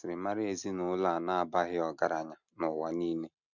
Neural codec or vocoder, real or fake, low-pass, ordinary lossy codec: none; real; 7.2 kHz; none